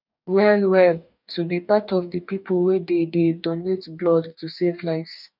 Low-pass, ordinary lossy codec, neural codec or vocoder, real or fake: 5.4 kHz; none; codec, 44.1 kHz, 2.6 kbps, DAC; fake